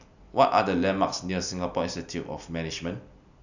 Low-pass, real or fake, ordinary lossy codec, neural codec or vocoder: 7.2 kHz; real; none; none